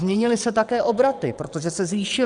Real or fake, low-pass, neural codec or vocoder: fake; 9.9 kHz; vocoder, 22.05 kHz, 80 mel bands, WaveNeXt